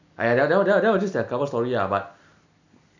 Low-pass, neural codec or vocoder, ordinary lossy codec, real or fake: 7.2 kHz; none; none; real